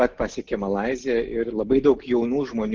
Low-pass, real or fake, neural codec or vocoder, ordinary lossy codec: 7.2 kHz; real; none; Opus, 16 kbps